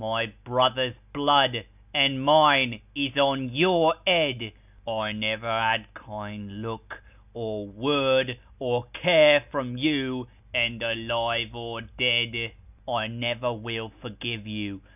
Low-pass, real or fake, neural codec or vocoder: 3.6 kHz; real; none